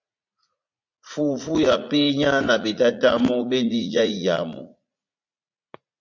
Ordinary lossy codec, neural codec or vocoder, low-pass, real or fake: MP3, 48 kbps; vocoder, 44.1 kHz, 80 mel bands, Vocos; 7.2 kHz; fake